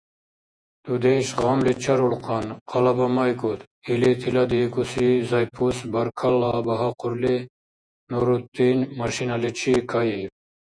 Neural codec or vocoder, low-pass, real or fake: vocoder, 48 kHz, 128 mel bands, Vocos; 9.9 kHz; fake